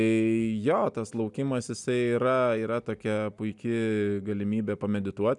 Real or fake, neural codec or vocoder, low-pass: real; none; 10.8 kHz